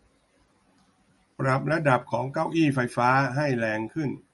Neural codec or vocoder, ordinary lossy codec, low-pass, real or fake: none; MP3, 48 kbps; 19.8 kHz; real